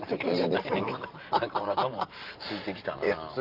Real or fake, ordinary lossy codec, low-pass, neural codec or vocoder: fake; Opus, 24 kbps; 5.4 kHz; vocoder, 44.1 kHz, 128 mel bands every 512 samples, BigVGAN v2